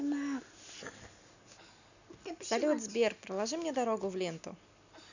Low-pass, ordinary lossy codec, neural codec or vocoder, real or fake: 7.2 kHz; none; none; real